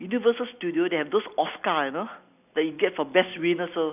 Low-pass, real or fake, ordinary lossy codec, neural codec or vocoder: 3.6 kHz; real; none; none